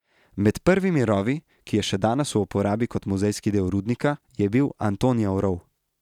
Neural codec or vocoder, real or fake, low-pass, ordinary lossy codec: none; real; 19.8 kHz; none